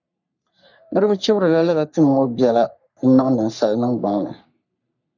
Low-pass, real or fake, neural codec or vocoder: 7.2 kHz; fake; codec, 44.1 kHz, 3.4 kbps, Pupu-Codec